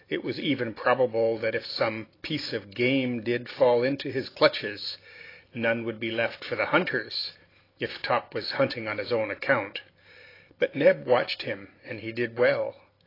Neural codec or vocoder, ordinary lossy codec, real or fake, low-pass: none; AAC, 24 kbps; real; 5.4 kHz